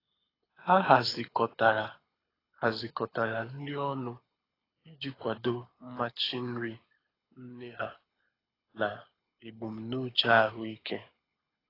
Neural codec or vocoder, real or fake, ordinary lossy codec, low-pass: codec, 24 kHz, 6 kbps, HILCodec; fake; AAC, 24 kbps; 5.4 kHz